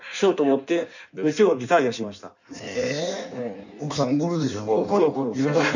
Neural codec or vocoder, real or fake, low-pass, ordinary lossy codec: codec, 16 kHz in and 24 kHz out, 1.1 kbps, FireRedTTS-2 codec; fake; 7.2 kHz; none